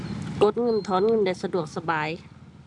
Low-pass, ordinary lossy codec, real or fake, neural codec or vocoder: 10.8 kHz; none; real; none